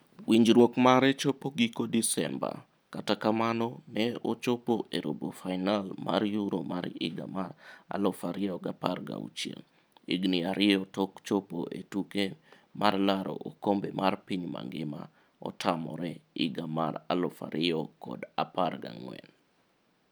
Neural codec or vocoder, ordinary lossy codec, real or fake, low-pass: none; none; real; none